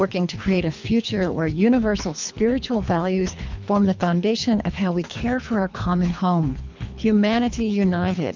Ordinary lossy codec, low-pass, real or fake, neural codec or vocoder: MP3, 64 kbps; 7.2 kHz; fake; codec, 24 kHz, 3 kbps, HILCodec